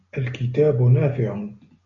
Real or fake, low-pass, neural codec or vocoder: real; 7.2 kHz; none